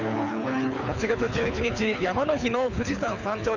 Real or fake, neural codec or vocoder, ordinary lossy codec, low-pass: fake; codec, 24 kHz, 6 kbps, HILCodec; none; 7.2 kHz